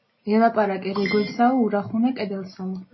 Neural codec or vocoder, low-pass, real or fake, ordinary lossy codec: none; 7.2 kHz; real; MP3, 24 kbps